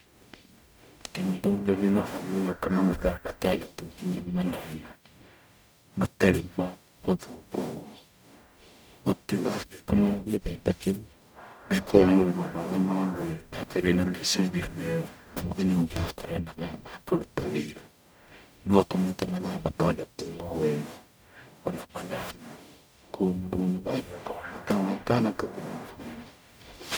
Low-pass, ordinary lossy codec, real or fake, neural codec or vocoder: none; none; fake; codec, 44.1 kHz, 0.9 kbps, DAC